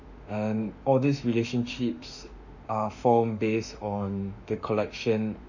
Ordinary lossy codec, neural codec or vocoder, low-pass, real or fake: none; autoencoder, 48 kHz, 32 numbers a frame, DAC-VAE, trained on Japanese speech; 7.2 kHz; fake